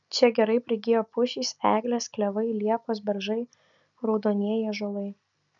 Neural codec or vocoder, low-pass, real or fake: none; 7.2 kHz; real